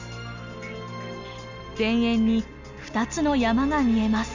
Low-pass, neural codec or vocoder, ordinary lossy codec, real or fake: 7.2 kHz; none; none; real